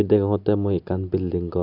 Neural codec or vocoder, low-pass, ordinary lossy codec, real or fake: none; 5.4 kHz; none; real